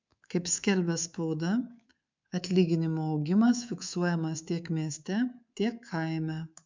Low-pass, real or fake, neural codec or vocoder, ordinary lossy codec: 7.2 kHz; fake; codec, 24 kHz, 3.1 kbps, DualCodec; MP3, 64 kbps